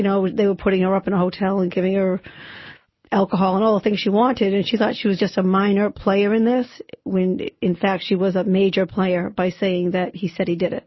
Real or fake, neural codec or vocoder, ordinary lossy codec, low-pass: real; none; MP3, 24 kbps; 7.2 kHz